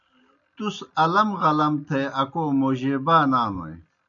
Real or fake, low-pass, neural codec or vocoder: real; 7.2 kHz; none